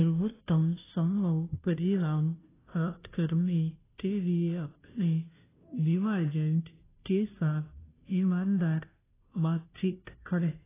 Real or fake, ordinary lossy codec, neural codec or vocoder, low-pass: fake; AAC, 16 kbps; codec, 16 kHz, 0.5 kbps, FunCodec, trained on Chinese and English, 25 frames a second; 3.6 kHz